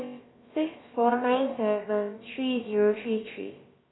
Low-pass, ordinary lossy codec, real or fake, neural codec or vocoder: 7.2 kHz; AAC, 16 kbps; fake; codec, 16 kHz, about 1 kbps, DyCAST, with the encoder's durations